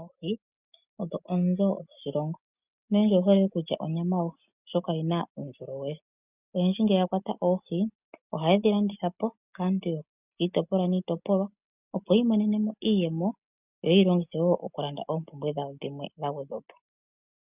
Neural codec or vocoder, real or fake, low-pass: none; real; 3.6 kHz